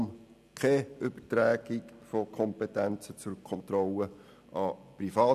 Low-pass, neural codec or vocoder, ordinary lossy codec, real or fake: 14.4 kHz; none; none; real